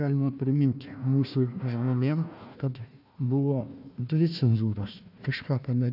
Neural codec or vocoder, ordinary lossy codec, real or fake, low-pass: codec, 16 kHz, 1 kbps, FunCodec, trained on Chinese and English, 50 frames a second; AAC, 48 kbps; fake; 5.4 kHz